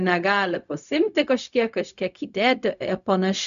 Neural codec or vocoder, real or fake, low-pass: codec, 16 kHz, 0.4 kbps, LongCat-Audio-Codec; fake; 7.2 kHz